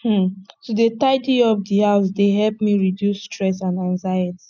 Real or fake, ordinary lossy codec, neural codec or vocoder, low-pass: real; none; none; 7.2 kHz